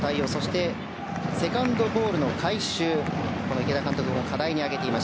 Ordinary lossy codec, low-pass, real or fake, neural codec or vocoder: none; none; real; none